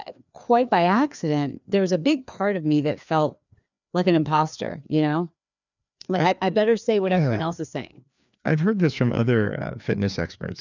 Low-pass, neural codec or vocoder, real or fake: 7.2 kHz; codec, 16 kHz, 2 kbps, FreqCodec, larger model; fake